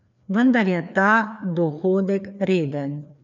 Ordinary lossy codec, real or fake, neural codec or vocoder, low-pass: none; fake; codec, 16 kHz, 2 kbps, FreqCodec, larger model; 7.2 kHz